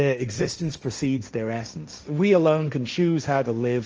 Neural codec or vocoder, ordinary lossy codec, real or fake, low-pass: codec, 16 kHz, 1.1 kbps, Voila-Tokenizer; Opus, 24 kbps; fake; 7.2 kHz